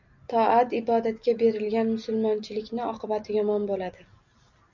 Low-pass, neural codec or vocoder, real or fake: 7.2 kHz; none; real